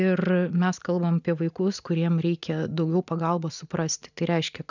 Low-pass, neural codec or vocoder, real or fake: 7.2 kHz; none; real